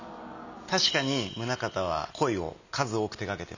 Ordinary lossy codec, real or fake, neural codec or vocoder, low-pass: none; real; none; 7.2 kHz